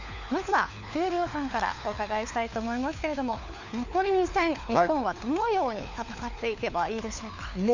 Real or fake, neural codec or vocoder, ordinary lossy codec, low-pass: fake; codec, 16 kHz, 4 kbps, FunCodec, trained on LibriTTS, 50 frames a second; none; 7.2 kHz